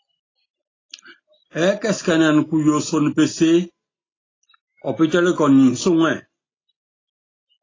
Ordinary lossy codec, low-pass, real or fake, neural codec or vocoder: AAC, 32 kbps; 7.2 kHz; real; none